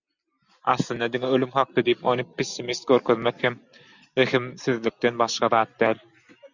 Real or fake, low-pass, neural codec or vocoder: real; 7.2 kHz; none